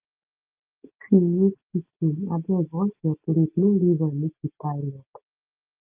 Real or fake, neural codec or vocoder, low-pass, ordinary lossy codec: real; none; 3.6 kHz; Opus, 32 kbps